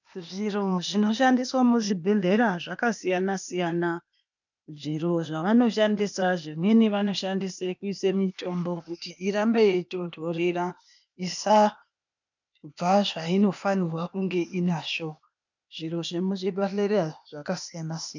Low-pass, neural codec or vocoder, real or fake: 7.2 kHz; codec, 16 kHz, 0.8 kbps, ZipCodec; fake